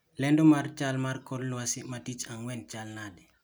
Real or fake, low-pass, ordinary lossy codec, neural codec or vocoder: real; none; none; none